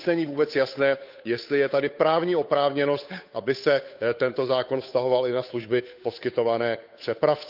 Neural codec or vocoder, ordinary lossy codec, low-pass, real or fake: codec, 16 kHz, 8 kbps, FunCodec, trained on Chinese and English, 25 frames a second; none; 5.4 kHz; fake